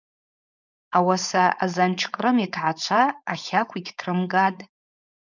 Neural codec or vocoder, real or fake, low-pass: codec, 16 kHz, 4.8 kbps, FACodec; fake; 7.2 kHz